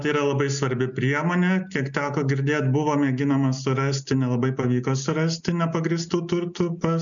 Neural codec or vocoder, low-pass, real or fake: none; 7.2 kHz; real